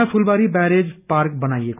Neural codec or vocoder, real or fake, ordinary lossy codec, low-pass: none; real; none; 3.6 kHz